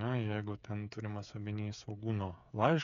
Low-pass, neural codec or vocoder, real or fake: 7.2 kHz; codec, 16 kHz, 8 kbps, FreqCodec, smaller model; fake